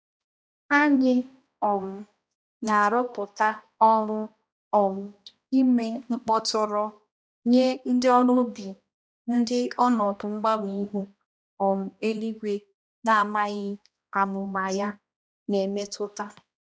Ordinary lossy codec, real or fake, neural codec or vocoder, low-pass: none; fake; codec, 16 kHz, 1 kbps, X-Codec, HuBERT features, trained on balanced general audio; none